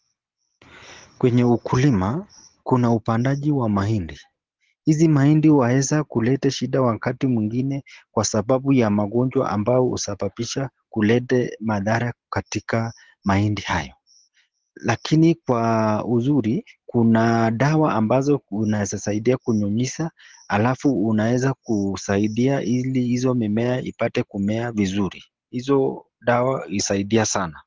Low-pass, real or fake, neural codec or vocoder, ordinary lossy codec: 7.2 kHz; real; none; Opus, 16 kbps